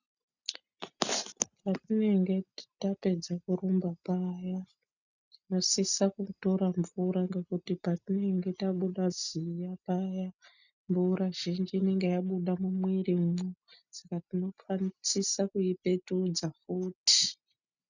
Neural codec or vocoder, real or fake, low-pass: none; real; 7.2 kHz